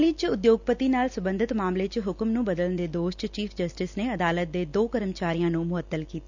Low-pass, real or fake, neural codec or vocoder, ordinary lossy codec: 7.2 kHz; real; none; none